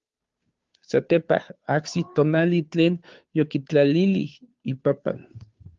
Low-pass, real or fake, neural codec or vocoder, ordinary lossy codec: 7.2 kHz; fake; codec, 16 kHz, 2 kbps, FunCodec, trained on Chinese and English, 25 frames a second; Opus, 32 kbps